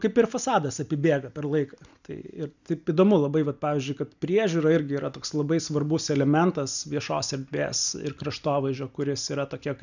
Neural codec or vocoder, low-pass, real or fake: none; 7.2 kHz; real